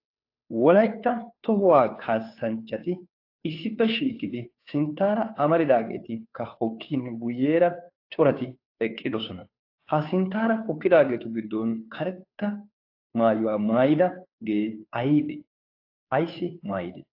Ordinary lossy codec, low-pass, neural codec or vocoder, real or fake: AAC, 32 kbps; 5.4 kHz; codec, 16 kHz, 2 kbps, FunCodec, trained on Chinese and English, 25 frames a second; fake